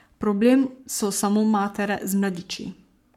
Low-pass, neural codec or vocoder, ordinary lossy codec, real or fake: 19.8 kHz; codec, 44.1 kHz, 7.8 kbps, Pupu-Codec; MP3, 96 kbps; fake